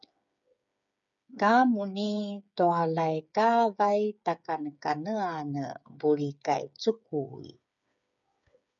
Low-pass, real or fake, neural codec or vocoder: 7.2 kHz; fake; codec, 16 kHz, 8 kbps, FreqCodec, smaller model